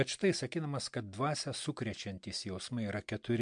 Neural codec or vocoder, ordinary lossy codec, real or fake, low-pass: none; MP3, 64 kbps; real; 9.9 kHz